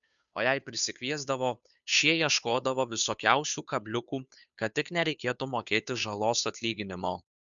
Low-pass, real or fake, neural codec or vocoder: 7.2 kHz; fake; codec, 16 kHz, 2 kbps, FunCodec, trained on Chinese and English, 25 frames a second